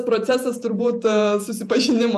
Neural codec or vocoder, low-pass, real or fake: vocoder, 48 kHz, 128 mel bands, Vocos; 14.4 kHz; fake